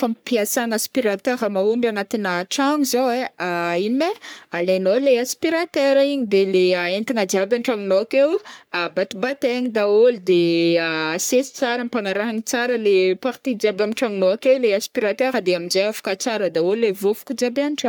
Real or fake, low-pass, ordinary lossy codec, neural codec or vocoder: fake; none; none; codec, 44.1 kHz, 3.4 kbps, Pupu-Codec